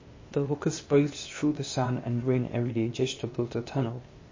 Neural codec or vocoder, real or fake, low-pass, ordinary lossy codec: codec, 16 kHz, 0.8 kbps, ZipCodec; fake; 7.2 kHz; MP3, 32 kbps